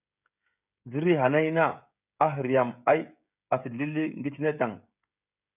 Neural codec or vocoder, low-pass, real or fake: codec, 16 kHz, 16 kbps, FreqCodec, smaller model; 3.6 kHz; fake